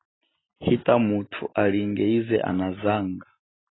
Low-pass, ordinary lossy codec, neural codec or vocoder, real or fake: 7.2 kHz; AAC, 16 kbps; none; real